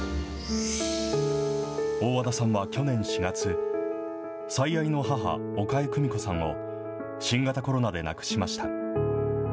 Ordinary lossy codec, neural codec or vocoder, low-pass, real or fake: none; none; none; real